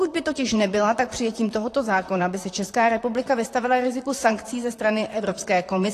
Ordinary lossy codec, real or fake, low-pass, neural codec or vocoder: AAC, 48 kbps; fake; 14.4 kHz; vocoder, 44.1 kHz, 128 mel bands, Pupu-Vocoder